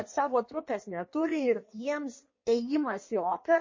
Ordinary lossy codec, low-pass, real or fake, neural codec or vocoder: MP3, 32 kbps; 7.2 kHz; fake; codec, 16 kHz in and 24 kHz out, 1.1 kbps, FireRedTTS-2 codec